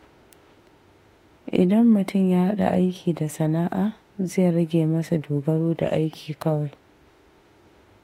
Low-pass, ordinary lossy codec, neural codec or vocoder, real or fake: 19.8 kHz; AAC, 48 kbps; autoencoder, 48 kHz, 32 numbers a frame, DAC-VAE, trained on Japanese speech; fake